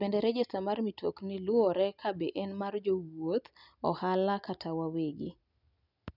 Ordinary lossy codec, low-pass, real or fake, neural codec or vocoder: none; 5.4 kHz; real; none